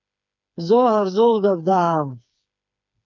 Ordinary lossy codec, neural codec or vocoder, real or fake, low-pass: MP3, 64 kbps; codec, 16 kHz, 4 kbps, FreqCodec, smaller model; fake; 7.2 kHz